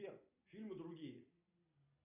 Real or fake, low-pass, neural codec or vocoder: real; 3.6 kHz; none